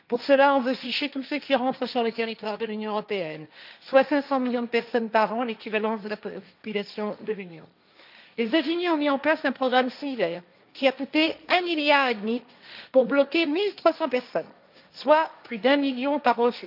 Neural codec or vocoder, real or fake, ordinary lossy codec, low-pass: codec, 16 kHz, 1.1 kbps, Voila-Tokenizer; fake; none; 5.4 kHz